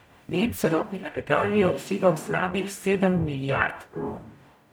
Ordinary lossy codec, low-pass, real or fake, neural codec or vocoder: none; none; fake; codec, 44.1 kHz, 0.9 kbps, DAC